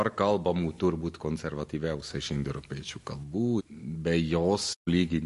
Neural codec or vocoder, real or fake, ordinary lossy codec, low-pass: none; real; MP3, 48 kbps; 14.4 kHz